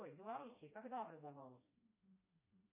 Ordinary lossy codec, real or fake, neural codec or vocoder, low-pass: MP3, 24 kbps; fake; codec, 16 kHz, 1 kbps, FreqCodec, smaller model; 3.6 kHz